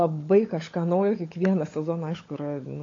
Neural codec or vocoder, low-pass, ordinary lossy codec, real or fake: codec, 16 kHz, 16 kbps, FunCodec, trained on LibriTTS, 50 frames a second; 7.2 kHz; AAC, 32 kbps; fake